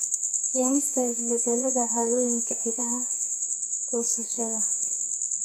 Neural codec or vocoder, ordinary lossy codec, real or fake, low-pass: codec, 44.1 kHz, 2.6 kbps, SNAC; none; fake; none